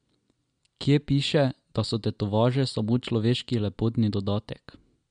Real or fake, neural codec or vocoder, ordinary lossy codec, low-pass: real; none; MP3, 64 kbps; 9.9 kHz